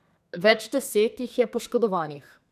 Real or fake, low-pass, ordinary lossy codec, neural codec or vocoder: fake; 14.4 kHz; none; codec, 32 kHz, 1.9 kbps, SNAC